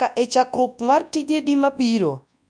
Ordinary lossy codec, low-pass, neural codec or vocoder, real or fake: none; 9.9 kHz; codec, 24 kHz, 0.9 kbps, WavTokenizer, large speech release; fake